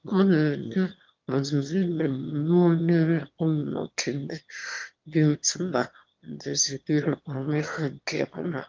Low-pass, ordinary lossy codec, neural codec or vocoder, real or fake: 7.2 kHz; Opus, 32 kbps; autoencoder, 22.05 kHz, a latent of 192 numbers a frame, VITS, trained on one speaker; fake